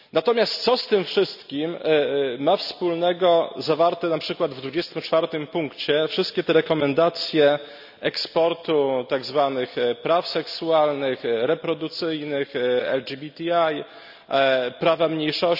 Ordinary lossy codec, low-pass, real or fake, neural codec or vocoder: none; 5.4 kHz; real; none